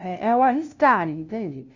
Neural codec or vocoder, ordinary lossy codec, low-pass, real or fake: codec, 16 kHz, 0.5 kbps, FunCodec, trained on LibriTTS, 25 frames a second; Opus, 64 kbps; 7.2 kHz; fake